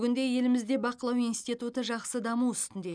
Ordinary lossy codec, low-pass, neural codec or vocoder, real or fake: none; 9.9 kHz; none; real